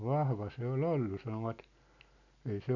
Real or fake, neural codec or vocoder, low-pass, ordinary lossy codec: real; none; 7.2 kHz; none